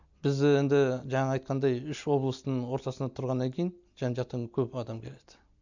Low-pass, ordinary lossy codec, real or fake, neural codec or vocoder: 7.2 kHz; none; real; none